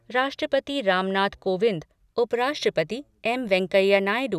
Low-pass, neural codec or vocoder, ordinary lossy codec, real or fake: 14.4 kHz; none; none; real